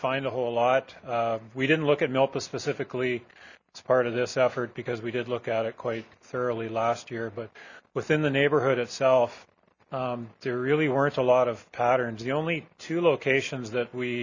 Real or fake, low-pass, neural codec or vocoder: real; 7.2 kHz; none